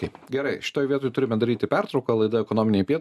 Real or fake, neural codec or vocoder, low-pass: real; none; 14.4 kHz